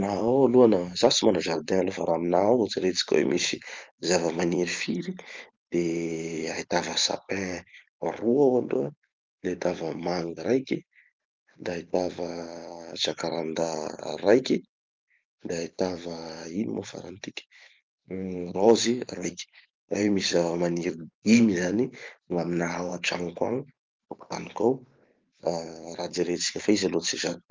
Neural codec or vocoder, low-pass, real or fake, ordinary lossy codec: vocoder, 44.1 kHz, 128 mel bands every 512 samples, BigVGAN v2; 7.2 kHz; fake; Opus, 32 kbps